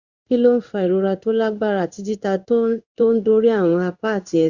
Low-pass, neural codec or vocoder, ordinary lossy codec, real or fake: 7.2 kHz; codec, 16 kHz in and 24 kHz out, 1 kbps, XY-Tokenizer; Opus, 64 kbps; fake